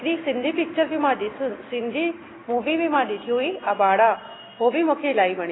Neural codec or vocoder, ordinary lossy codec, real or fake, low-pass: none; AAC, 16 kbps; real; 7.2 kHz